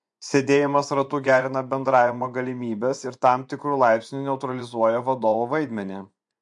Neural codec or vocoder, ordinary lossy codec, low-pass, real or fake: vocoder, 24 kHz, 100 mel bands, Vocos; MP3, 64 kbps; 10.8 kHz; fake